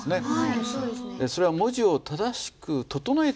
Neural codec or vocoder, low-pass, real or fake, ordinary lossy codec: none; none; real; none